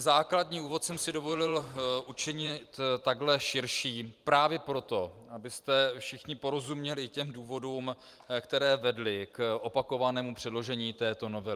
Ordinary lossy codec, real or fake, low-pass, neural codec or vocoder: Opus, 32 kbps; fake; 14.4 kHz; vocoder, 44.1 kHz, 128 mel bands every 512 samples, BigVGAN v2